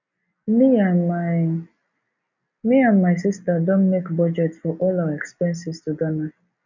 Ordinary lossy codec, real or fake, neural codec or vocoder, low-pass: none; real; none; 7.2 kHz